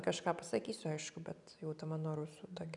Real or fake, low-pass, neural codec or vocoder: real; 10.8 kHz; none